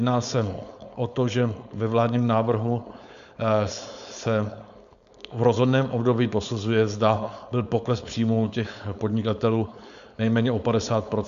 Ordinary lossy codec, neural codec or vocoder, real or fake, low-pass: AAC, 96 kbps; codec, 16 kHz, 4.8 kbps, FACodec; fake; 7.2 kHz